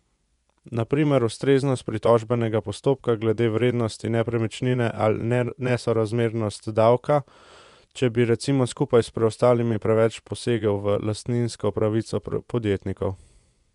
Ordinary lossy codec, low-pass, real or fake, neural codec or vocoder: none; 10.8 kHz; fake; vocoder, 24 kHz, 100 mel bands, Vocos